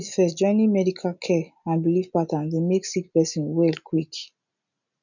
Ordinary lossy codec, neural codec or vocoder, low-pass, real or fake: none; none; 7.2 kHz; real